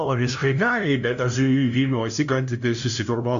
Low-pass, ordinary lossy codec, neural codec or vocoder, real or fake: 7.2 kHz; MP3, 64 kbps; codec, 16 kHz, 0.5 kbps, FunCodec, trained on LibriTTS, 25 frames a second; fake